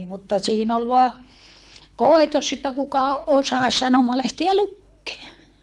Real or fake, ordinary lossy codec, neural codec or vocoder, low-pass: fake; none; codec, 24 kHz, 3 kbps, HILCodec; none